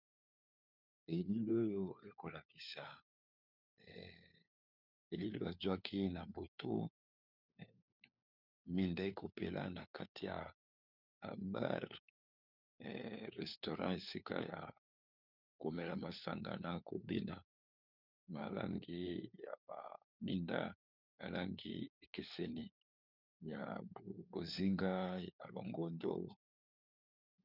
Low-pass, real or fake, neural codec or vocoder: 5.4 kHz; fake; codec, 16 kHz, 4 kbps, FunCodec, trained on LibriTTS, 50 frames a second